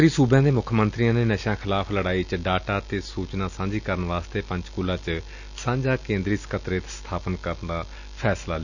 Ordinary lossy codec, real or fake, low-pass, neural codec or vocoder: none; real; 7.2 kHz; none